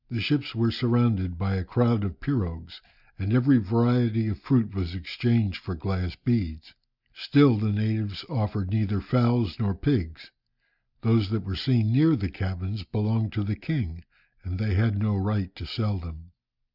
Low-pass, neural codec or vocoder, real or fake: 5.4 kHz; none; real